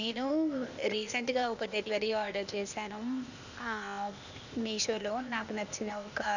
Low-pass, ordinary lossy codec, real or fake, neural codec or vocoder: 7.2 kHz; none; fake; codec, 16 kHz, 0.8 kbps, ZipCodec